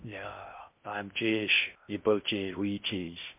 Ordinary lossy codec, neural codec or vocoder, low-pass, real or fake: MP3, 32 kbps; codec, 16 kHz in and 24 kHz out, 0.6 kbps, FocalCodec, streaming, 2048 codes; 3.6 kHz; fake